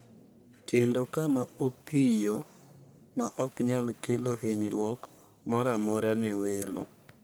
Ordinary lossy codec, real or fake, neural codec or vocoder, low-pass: none; fake; codec, 44.1 kHz, 1.7 kbps, Pupu-Codec; none